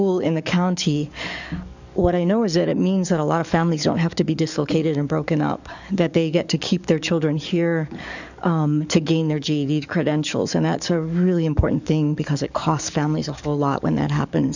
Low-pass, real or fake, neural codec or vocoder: 7.2 kHz; fake; codec, 44.1 kHz, 7.8 kbps, DAC